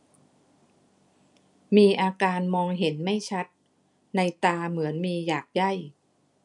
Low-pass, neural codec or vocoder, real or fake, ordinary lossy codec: 10.8 kHz; none; real; none